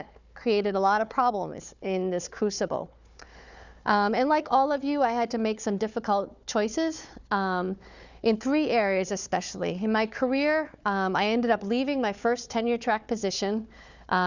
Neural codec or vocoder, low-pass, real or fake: codec, 16 kHz, 4 kbps, FunCodec, trained on Chinese and English, 50 frames a second; 7.2 kHz; fake